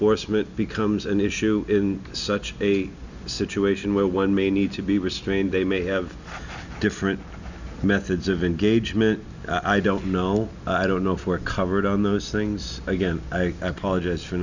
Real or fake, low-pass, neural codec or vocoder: real; 7.2 kHz; none